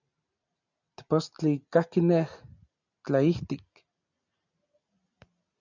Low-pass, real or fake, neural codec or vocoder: 7.2 kHz; real; none